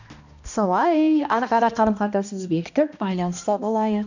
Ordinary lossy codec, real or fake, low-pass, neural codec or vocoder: none; fake; 7.2 kHz; codec, 16 kHz, 1 kbps, X-Codec, HuBERT features, trained on balanced general audio